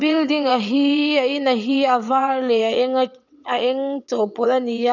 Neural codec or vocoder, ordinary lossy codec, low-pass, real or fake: vocoder, 22.05 kHz, 80 mel bands, WaveNeXt; none; 7.2 kHz; fake